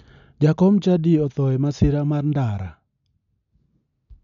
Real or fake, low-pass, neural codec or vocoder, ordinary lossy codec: real; 7.2 kHz; none; none